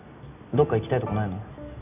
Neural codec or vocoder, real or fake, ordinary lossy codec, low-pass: none; real; none; 3.6 kHz